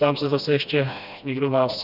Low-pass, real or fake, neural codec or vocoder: 5.4 kHz; fake; codec, 16 kHz, 1 kbps, FreqCodec, smaller model